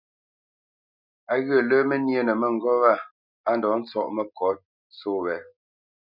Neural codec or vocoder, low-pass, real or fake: none; 5.4 kHz; real